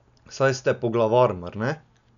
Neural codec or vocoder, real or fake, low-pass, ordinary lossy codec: none; real; 7.2 kHz; none